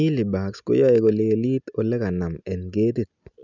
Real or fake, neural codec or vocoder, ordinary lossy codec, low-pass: real; none; none; 7.2 kHz